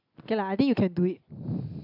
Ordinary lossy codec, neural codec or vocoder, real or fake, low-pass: AAC, 32 kbps; none; real; 5.4 kHz